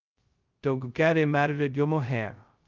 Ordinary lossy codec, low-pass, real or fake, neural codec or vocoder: Opus, 32 kbps; 7.2 kHz; fake; codec, 16 kHz, 0.2 kbps, FocalCodec